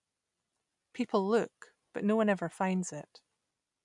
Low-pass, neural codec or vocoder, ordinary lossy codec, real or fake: 10.8 kHz; none; none; real